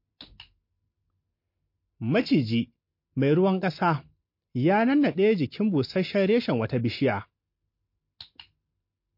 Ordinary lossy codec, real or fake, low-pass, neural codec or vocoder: MP3, 32 kbps; real; 5.4 kHz; none